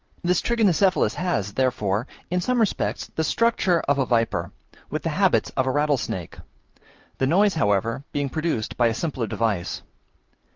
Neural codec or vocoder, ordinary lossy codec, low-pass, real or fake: none; Opus, 24 kbps; 7.2 kHz; real